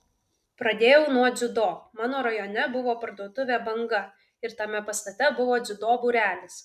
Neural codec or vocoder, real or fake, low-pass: none; real; 14.4 kHz